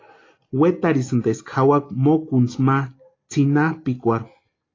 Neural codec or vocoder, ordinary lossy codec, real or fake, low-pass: none; AAC, 32 kbps; real; 7.2 kHz